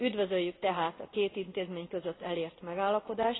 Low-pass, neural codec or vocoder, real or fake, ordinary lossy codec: 7.2 kHz; none; real; AAC, 16 kbps